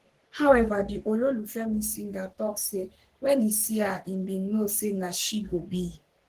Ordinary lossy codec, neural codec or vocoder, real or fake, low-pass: Opus, 16 kbps; codec, 44.1 kHz, 3.4 kbps, Pupu-Codec; fake; 14.4 kHz